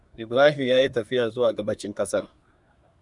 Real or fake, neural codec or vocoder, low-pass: fake; codec, 24 kHz, 1 kbps, SNAC; 10.8 kHz